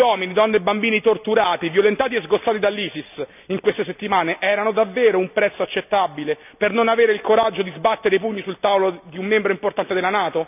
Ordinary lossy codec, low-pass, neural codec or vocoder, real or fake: none; 3.6 kHz; none; real